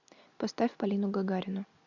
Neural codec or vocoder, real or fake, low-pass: none; real; 7.2 kHz